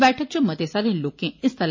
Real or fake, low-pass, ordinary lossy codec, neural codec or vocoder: real; 7.2 kHz; MP3, 32 kbps; none